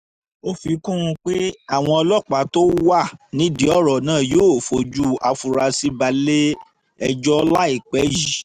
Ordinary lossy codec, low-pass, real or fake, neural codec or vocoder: none; 14.4 kHz; real; none